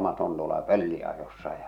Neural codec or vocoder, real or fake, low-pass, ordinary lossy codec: none; real; 19.8 kHz; none